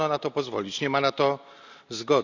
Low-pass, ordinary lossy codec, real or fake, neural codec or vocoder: 7.2 kHz; none; real; none